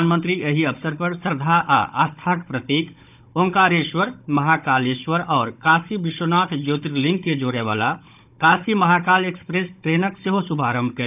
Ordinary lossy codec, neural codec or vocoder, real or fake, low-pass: none; codec, 16 kHz, 16 kbps, FunCodec, trained on Chinese and English, 50 frames a second; fake; 3.6 kHz